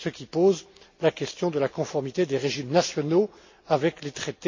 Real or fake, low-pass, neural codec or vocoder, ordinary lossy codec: real; 7.2 kHz; none; MP3, 32 kbps